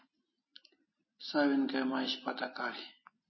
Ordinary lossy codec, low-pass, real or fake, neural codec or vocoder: MP3, 24 kbps; 7.2 kHz; real; none